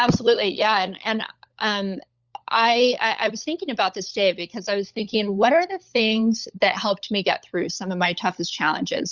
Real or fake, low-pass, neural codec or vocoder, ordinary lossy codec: fake; 7.2 kHz; codec, 16 kHz, 16 kbps, FunCodec, trained on LibriTTS, 50 frames a second; Opus, 64 kbps